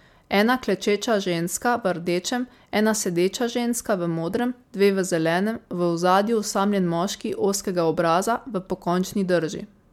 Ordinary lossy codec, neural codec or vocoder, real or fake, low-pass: MP3, 96 kbps; none; real; 19.8 kHz